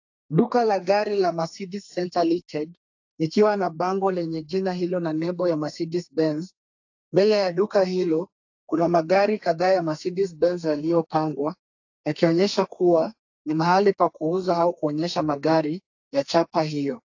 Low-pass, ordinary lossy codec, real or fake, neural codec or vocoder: 7.2 kHz; AAC, 48 kbps; fake; codec, 32 kHz, 1.9 kbps, SNAC